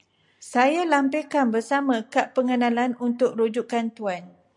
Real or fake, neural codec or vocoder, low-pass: real; none; 10.8 kHz